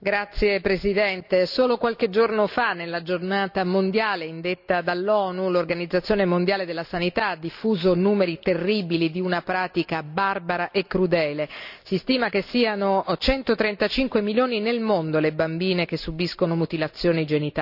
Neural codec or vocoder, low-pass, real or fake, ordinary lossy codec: none; 5.4 kHz; real; none